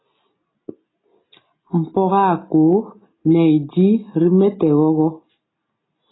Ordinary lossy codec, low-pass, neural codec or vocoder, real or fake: AAC, 16 kbps; 7.2 kHz; none; real